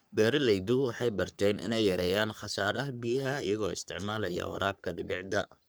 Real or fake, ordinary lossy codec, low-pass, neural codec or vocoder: fake; none; none; codec, 44.1 kHz, 3.4 kbps, Pupu-Codec